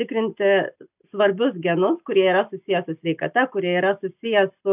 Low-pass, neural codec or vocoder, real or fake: 3.6 kHz; none; real